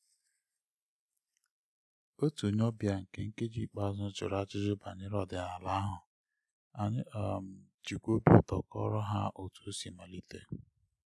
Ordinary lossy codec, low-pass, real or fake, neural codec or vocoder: none; none; real; none